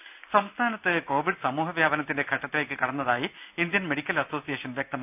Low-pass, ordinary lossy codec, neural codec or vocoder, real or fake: 3.6 kHz; none; none; real